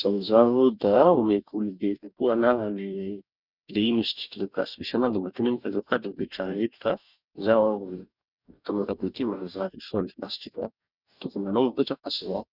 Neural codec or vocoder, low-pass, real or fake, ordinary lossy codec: codec, 44.1 kHz, 2.6 kbps, DAC; 5.4 kHz; fake; none